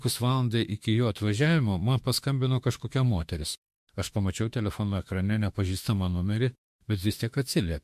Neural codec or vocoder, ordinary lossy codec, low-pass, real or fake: autoencoder, 48 kHz, 32 numbers a frame, DAC-VAE, trained on Japanese speech; MP3, 64 kbps; 14.4 kHz; fake